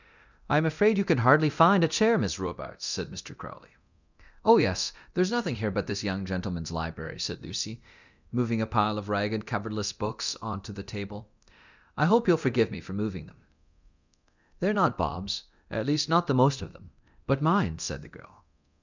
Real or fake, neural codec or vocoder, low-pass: fake; codec, 24 kHz, 0.9 kbps, DualCodec; 7.2 kHz